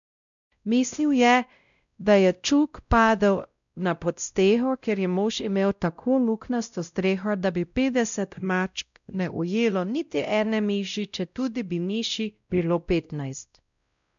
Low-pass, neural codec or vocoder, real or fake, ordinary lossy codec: 7.2 kHz; codec, 16 kHz, 0.5 kbps, X-Codec, WavLM features, trained on Multilingual LibriSpeech; fake; none